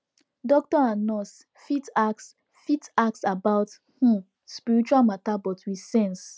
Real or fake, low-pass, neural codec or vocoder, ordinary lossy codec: real; none; none; none